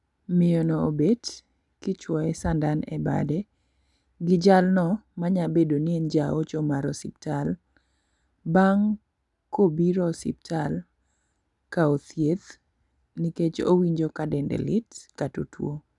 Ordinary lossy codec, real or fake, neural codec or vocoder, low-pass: none; real; none; 10.8 kHz